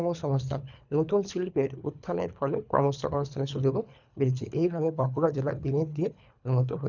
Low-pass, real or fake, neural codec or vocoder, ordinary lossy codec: 7.2 kHz; fake; codec, 24 kHz, 3 kbps, HILCodec; none